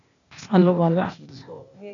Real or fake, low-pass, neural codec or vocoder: fake; 7.2 kHz; codec, 16 kHz, 0.8 kbps, ZipCodec